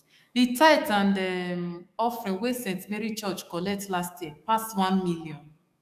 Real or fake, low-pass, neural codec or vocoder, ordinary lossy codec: fake; 14.4 kHz; codec, 44.1 kHz, 7.8 kbps, DAC; none